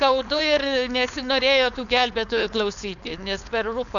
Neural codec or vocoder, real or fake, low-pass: codec, 16 kHz, 4.8 kbps, FACodec; fake; 7.2 kHz